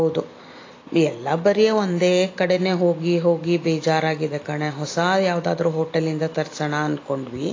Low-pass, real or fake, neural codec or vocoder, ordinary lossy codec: 7.2 kHz; real; none; AAC, 32 kbps